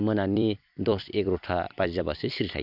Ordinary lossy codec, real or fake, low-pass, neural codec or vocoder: none; fake; 5.4 kHz; vocoder, 44.1 kHz, 128 mel bands every 256 samples, BigVGAN v2